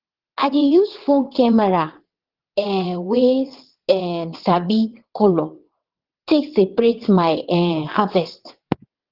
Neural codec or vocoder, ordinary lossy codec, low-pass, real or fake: vocoder, 22.05 kHz, 80 mel bands, WaveNeXt; Opus, 16 kbps; 5.4 kHz; fake